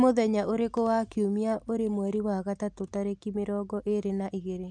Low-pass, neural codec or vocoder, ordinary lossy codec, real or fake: 9.9 kHz; none; none; real